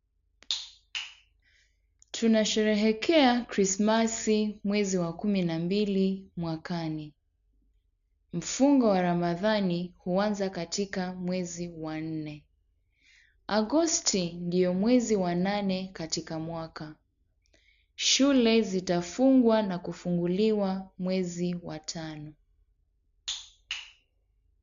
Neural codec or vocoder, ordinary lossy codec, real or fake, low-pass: none; none; real; 7.2 kHz